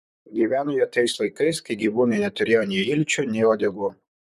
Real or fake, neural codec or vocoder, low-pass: fake; vocoder, 44.1 kHz, 128 mel bands, Pupu-Vocoder; 14.4 kHz